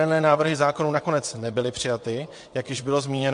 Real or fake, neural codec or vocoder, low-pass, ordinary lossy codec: fake; vocoder, 22.05 kHz, 80 mel bands, WaveNeXt; 9.9 kHz; MP3, 48 kbps